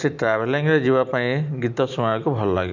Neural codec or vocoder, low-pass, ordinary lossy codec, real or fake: none; 7.2 kHz; none; real